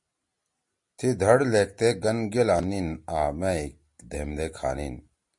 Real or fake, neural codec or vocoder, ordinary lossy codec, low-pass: real; none; MP3, 48 kbps; 10.8 kHz